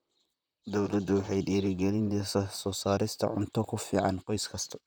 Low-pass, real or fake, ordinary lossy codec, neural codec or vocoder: none; fake; none; vocoder, 44.1 kHz, 128 mel bands, Pupu-Vocoder